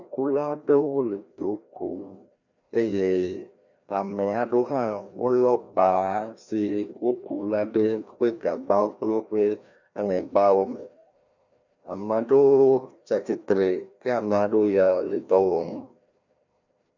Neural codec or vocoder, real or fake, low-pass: codec, 16 kHz, 1 kbps, FreqCodec, larger model; fake; 7.2 kHz